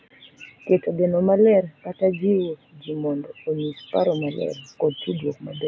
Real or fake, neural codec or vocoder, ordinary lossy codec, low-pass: real; none; none; none